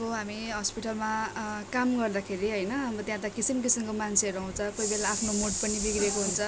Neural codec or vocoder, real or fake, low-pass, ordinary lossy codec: none; real; none; none